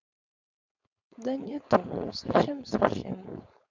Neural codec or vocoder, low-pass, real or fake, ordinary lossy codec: codec, 16 kHz, 4.8 kbps, FACodec; 7.2 kHz; fake; none